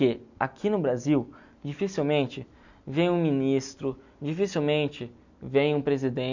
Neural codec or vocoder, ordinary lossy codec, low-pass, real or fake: none; none; 7.2 kHz; real